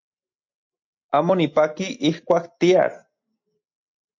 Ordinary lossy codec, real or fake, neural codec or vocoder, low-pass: MP3, 48 kbps; real; none; 7.2 kHz